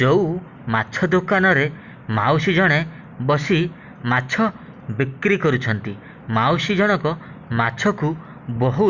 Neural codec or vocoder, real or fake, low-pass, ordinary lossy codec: none; real; 7.2 kHz; Opus, 64 kbps